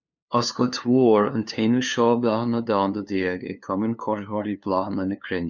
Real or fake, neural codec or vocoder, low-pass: fake; codec, 16 kHz, 2 kbps, FunCodec, trained on LibriTTS, 25 frames a second; 7.2 kHz